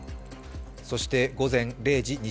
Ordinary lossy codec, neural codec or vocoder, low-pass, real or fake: none; none; none; real